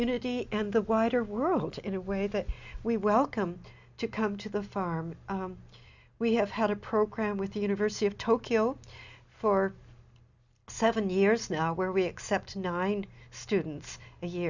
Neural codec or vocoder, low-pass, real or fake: none; 7.2 kHz; real